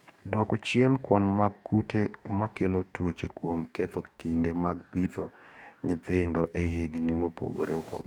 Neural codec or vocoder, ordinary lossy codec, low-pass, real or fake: codec, 44.1 kHz, 2.6 kbps, DAC; none; 19.8 kHz; fake